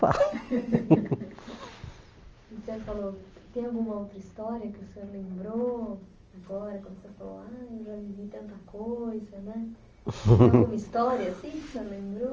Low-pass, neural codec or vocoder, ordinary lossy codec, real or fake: 7.2 kHz; none; Opus, 24 kbps; real